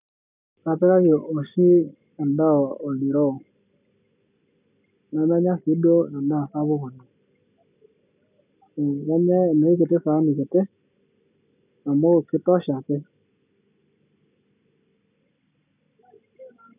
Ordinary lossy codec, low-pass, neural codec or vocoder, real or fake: none; 3.6 kHz; none; real